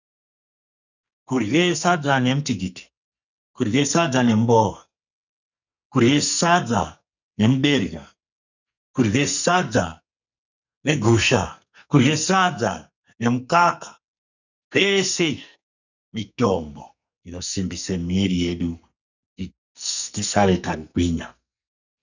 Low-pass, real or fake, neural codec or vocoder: 7.2 kHz; fake; codec, 44.1 kHz, 2.6 kbps, SNAC